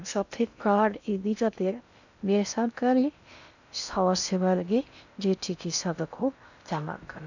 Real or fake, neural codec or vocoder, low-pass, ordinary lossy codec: fake; codec, 16 kHz in and 24 kHz out, 0.6 kbps, FocalCodec, streaming, 2048 codes; 7.2 kHz; none